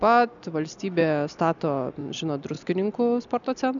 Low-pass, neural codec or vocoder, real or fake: 7.2 kHz; none; real